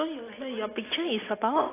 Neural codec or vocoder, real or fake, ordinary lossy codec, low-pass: none; real; AAC, 16 kbps; 3.6 kHz